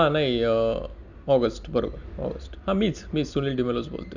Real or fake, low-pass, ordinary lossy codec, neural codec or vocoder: real; 7.2 kHz; none; none